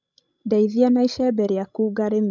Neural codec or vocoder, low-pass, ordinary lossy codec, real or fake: codec, 16 kHz, 16 kbps, FreqCodec, larger model; 7.2 kHz; none; fake